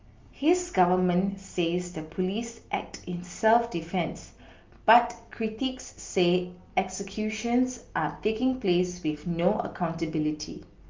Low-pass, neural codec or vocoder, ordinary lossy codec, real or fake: 7.2 kHz; none; Opus, 32 kbps; real